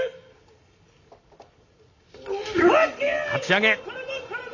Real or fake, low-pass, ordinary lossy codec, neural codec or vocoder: real; 7.2 kHz; none; none